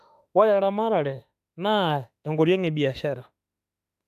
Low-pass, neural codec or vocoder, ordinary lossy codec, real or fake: 14.4 kHz; autoencoder, 48 kHz, 32 numbers a frame, DAC-VAE, trained on Japanese speech; AAC, 96 kbps; fake